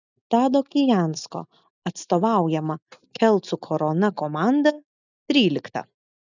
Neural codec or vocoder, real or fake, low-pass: none; real; 7.2 kHz